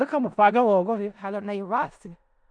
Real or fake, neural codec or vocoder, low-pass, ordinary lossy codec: fake; codec, 16 kHz in and 24 kHz out, 0.4 kbps, LongCat-Audio-Codec, four codebook decoder; 9.9 kHz; MP3, 64 kbps